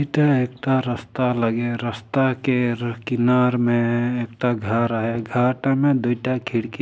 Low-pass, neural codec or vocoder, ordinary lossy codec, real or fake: none; none; none; real